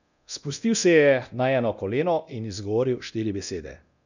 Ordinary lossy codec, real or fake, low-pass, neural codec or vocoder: none; fake; 7.2 kHz; codec, 24 kHz, 0.9 kbps, DualCodec